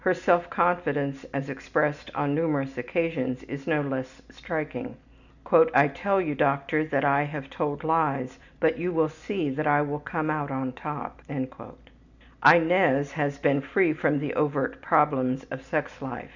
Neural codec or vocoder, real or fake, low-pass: none; real; 7.2 kHz